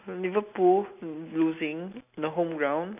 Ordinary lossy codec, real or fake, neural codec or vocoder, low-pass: none; real; none; 3.6 kHz